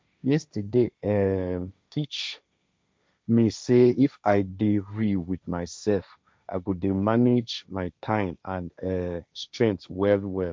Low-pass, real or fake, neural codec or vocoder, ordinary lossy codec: none; fake; codec, 16 kHz, 1.1 kbps, Voila-Tokenizer; none